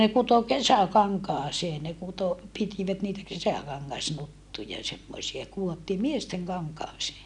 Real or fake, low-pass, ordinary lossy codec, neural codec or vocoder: real; 10.8 kHz; none; none